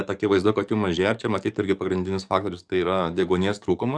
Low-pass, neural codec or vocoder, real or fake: 9.9 kHz; codec, 44.1 kHz, 7.8 kbps, DAC; fake